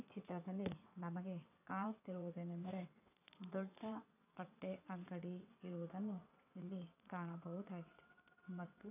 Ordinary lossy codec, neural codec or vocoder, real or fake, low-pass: none; vocoder, 22.05 kHz, 80 mel bands, WaveNeXt; fake; 3.6 kHz